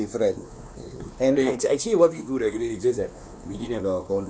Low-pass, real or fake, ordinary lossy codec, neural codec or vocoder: none; fake; none; codec, 16 kHz, 2 kbps, X-Codec, WavLM features, trained on Multilingual LibriSpeech